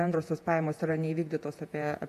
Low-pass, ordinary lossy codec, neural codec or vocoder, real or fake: 14.4 kHz; MP3, 64 kbps; vocoder, 48 kHz, 128 mel bands, Vocos; fake